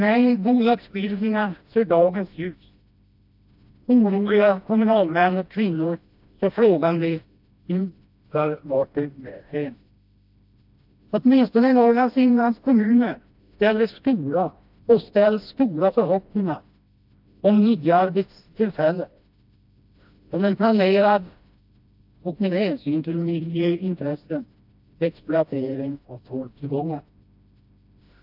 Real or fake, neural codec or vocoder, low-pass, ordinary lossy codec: fake; codec, 16 kHz, 1 kbps, FreqCodec, smaller model; 5.4 kHz; AAC, 48 kbps